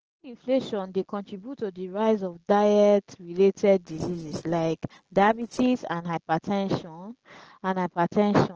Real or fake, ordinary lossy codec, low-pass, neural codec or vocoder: real; Opus, 16 kbps; 7.2 kHz; none